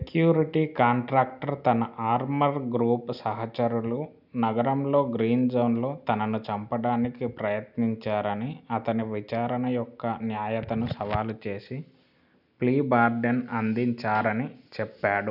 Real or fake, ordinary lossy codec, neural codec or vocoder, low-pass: real; none; none; 5.4 kHz